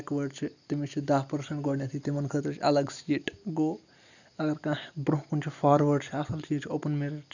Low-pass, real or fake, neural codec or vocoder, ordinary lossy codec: 7.2 kHz; real; none; none